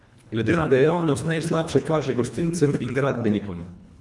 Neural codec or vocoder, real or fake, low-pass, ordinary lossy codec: codec, 24 kHz, 1.5 kbps, HILCodec; fake; none; none